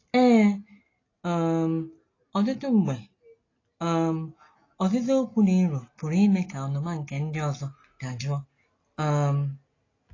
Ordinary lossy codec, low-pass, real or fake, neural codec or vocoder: AAC, 32 kbps; 7.2 kHz; real; none